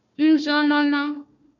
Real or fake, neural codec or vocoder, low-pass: fake; codec, 16 kHz, 1 kbps, FunCodec, trained on Chinese and English, 50 frames a second; 7.2 kHz